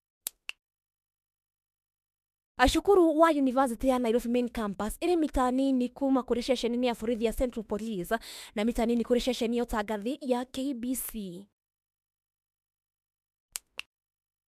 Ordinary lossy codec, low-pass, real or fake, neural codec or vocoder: MP3, 96 kbps; 14.4 kHz; fake; autoencoder, 48 kHz, 32 numbers a frame, DAC-VAE, trained on Japanese speech